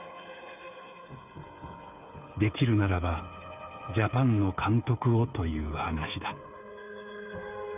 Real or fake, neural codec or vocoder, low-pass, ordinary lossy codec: fake; codec, 16 kHz, 8 kbps, FreqCodec, smaller model; 3.6 kHz; none